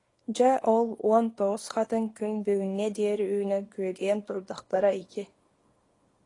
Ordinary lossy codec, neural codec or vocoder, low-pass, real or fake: AAC, 32 kbps; codec, 24 kHz, 0.9 kbps, WavTokenizer, small release; 10.8 kHz; fake